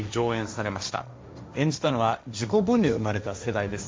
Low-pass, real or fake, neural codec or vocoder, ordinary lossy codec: none; fake; codec, 16 kHz, 1.1 kbps, Voila-Tokenizer; none